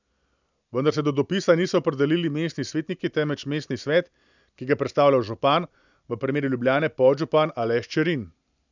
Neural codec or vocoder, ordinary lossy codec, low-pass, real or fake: none; none; 7.2 kHz; real